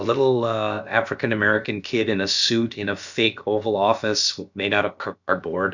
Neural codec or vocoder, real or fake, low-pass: codec, 16 kHz, about 1 kbps, DyCAST, with the encoder's durations; fake; 7.2 kHz